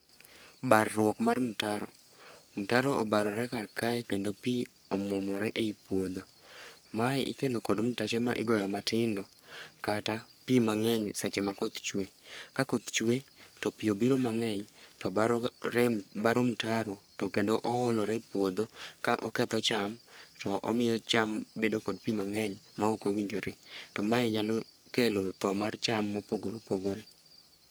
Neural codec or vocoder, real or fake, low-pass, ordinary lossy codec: codec, 44.1 kHz, 3.4 kbps, Pupu-Codec; fake; none; none